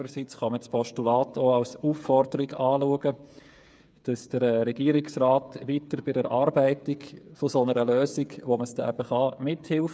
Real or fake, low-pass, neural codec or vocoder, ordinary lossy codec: fake; none; codec, 16 kHz, 8 kbps, FreqCodec, smaller model; none